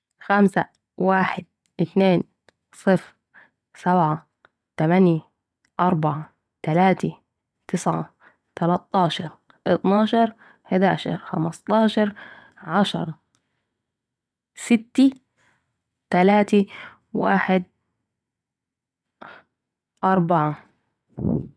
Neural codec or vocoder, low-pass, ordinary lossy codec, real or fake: vocoder, 22.05 kHz, 80 mel bands, WaveNeXt; none; none; fake